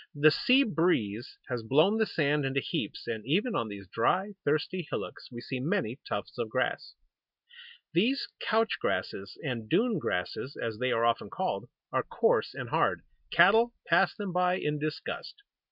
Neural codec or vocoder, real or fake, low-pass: none; real; 5.4 kHz